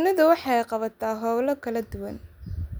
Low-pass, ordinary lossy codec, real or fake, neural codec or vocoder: none; none; real; none